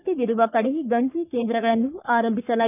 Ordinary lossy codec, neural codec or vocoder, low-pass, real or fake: none; codec, 44.1 kHz, 3.4 kbps, Pupu-Codec; 3.6 kHz; fake